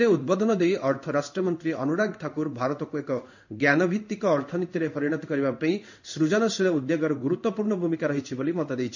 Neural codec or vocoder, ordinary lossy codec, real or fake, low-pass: codec, 16 kHz in and 24 kHz out, 1 kbps, XY-Tokenizer; none; fake; 7.2 kHz